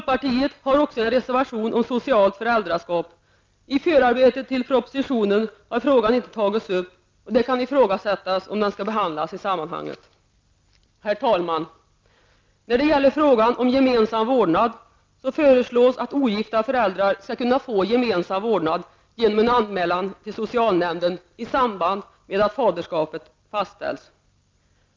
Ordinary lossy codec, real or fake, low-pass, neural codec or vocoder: Opus, 24 kbps; real; 7.2 kHz; none